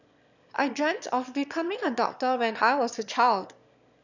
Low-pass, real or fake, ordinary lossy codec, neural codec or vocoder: 7.2 kHz; fake; none; autoencoder, 22.05 kHz, a latent of 192 numbers a frame, VITS, trained on one speaker